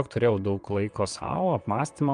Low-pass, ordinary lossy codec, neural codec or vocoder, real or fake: 9.9 kHz; Opus, 32 kbps; vocoder, 22.05 kHz, 80 mel bands, WaveNeXt; fake